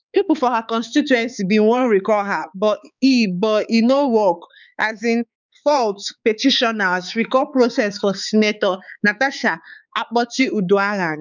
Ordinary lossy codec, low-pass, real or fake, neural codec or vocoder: none; 7.2 kHz; fake; codec, 16 kHz, 4 kbps, X-Codec, HuBERT features, trained on balanced general audio